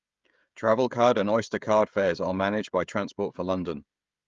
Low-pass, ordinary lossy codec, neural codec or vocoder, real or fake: 7.2 kHz; Opus, 32 kbps; codec, 16 kHz, 16 kbps, FreqCodec, smaller model; fake